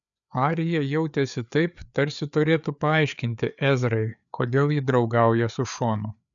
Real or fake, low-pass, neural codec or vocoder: fake; 7.2 kHz; codec, 16 kHz, 4 kbps, FreqCodec, larger model